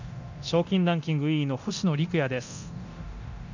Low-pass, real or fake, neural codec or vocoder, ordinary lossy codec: 7.2 kHz; fake; codec, 24 kHz, 0.9 kbps, DualCodec; none